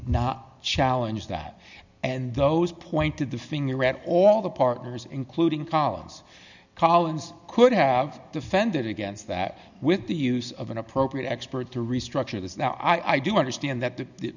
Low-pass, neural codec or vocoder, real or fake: 7.2 kHz; none; real